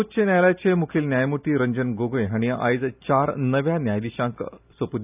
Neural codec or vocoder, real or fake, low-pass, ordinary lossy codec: none; real; 3.6 kHz; none